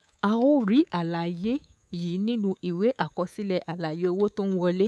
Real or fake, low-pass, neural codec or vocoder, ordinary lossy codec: fake; none; codec, 24 kHz, 3.1 kbps, DualCodec; none